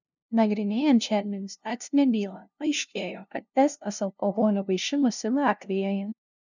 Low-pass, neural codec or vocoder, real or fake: 7.2 kHz; codec, 16 kHz, 0.5 kbps, FunCodec, trained on LibriTTS, 25 frames a second; fake